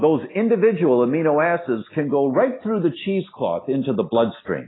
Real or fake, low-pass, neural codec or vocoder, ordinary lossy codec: real; 7.2 kHz; none; AAC, 16 kbps